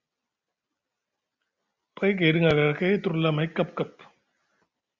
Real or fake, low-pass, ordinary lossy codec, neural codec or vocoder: real; 7.2 kHz; Opus, 64 kbps; none